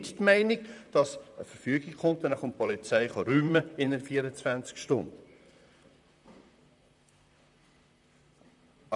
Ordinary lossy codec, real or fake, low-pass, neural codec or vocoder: none; fake; 10.8 kHz; vocoder, 44.1 kHz, 128 mel bands, Pupu-Vocoder